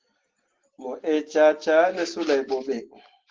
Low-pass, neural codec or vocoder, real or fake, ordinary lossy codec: 7.2 kHz; none; real; Opus, 16 kbps